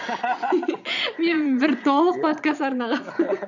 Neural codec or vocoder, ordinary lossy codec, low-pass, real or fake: vocoder, 22.05 kHz, 80 mel bands, Vocos; none; 7.2 kHz; fake